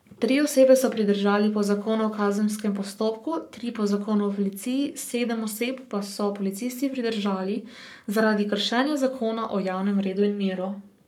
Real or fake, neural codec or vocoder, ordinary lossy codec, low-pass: fake; codec, 44.1 kHz, 7.8 kbps, Pupu-Codec; none; 19.8 kHz